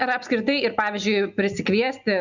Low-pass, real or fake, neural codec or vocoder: 7.2 kHz; real; none